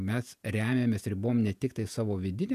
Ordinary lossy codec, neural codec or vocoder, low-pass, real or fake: MP3, 96 kbps; vocoder, 48 kHz, 128 mel bands, Vocos; 14.4 kHz; fake